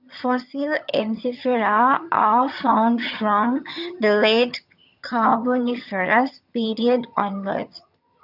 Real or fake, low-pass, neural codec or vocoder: fake; 5.4 kHz; vocoder, 22.05 kHz, 80 mel bands, HiFi-GAN